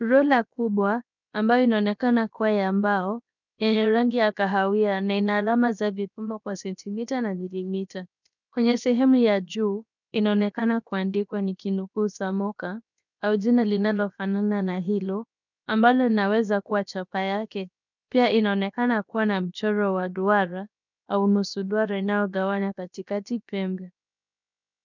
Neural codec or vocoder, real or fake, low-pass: codec, 16 kHz, 0.7 kbps, FocalCodec; fake; 7.2 kHz